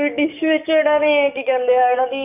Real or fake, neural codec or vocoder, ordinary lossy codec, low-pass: fake; codec, 44.1 kHz, 7.8 kbps, DAC; none; 3.6 kHz